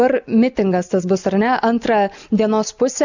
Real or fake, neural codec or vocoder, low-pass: real; none; 7.2 kHz